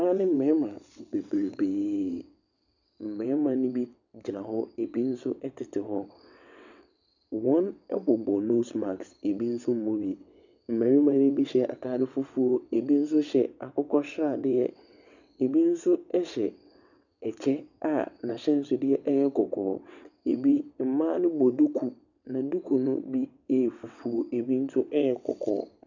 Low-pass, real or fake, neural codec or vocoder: 7.2 kHz; fake; vocoder, 22.05 kHz, 80 mel bands, WaveNeXt